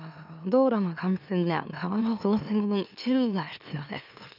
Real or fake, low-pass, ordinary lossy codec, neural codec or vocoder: fake; 5.4 kHz; none; autoencoder, 44.1 kHz, a latent of 192 numbers a frame, MeloTTS